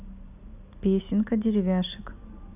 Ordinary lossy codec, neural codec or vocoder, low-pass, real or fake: none; none; 3.6 kHz; real